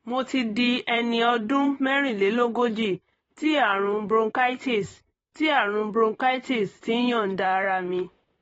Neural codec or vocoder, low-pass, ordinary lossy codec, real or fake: vocoder, 44.1 kHz, 128 mel bands, Pupu-Vocoder; 19.8 kHz; AAC, 24 kbps; fake